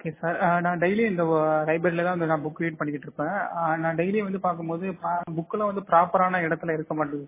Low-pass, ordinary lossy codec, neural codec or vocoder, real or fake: 3.6 kHz; MP3, 16 kbps; none; real